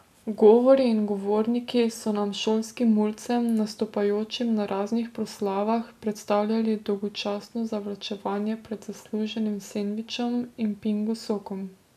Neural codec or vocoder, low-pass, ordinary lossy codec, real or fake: none; 14.4 kHz; none; real